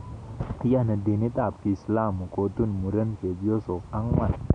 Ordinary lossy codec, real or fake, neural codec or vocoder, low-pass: none; real; none; 9.9 kHz